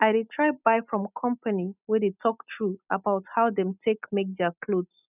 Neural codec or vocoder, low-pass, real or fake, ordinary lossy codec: none; 3.6 kHz; real; none